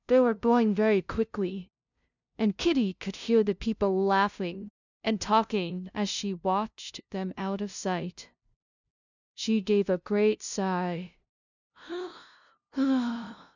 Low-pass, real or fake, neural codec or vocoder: 7.2 kHz; fake; codec, 16 kHz, 0.5 kbps, FunCodec, trained on LibriTTS, 25 frames a second